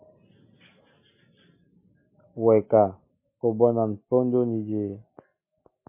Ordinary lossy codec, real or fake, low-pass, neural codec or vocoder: MP3, 24 kbps; real; 3.6 kHz; none